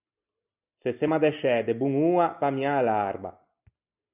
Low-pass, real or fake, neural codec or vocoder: 3.6 kHz; real; none